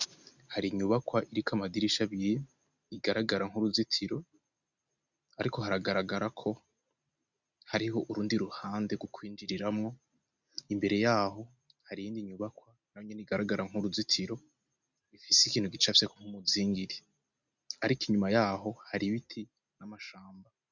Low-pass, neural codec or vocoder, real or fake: 7.2 kHz; none; real